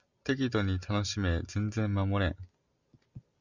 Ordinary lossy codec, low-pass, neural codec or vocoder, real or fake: Opus, 64 kbps; 7.2 kHz; none; real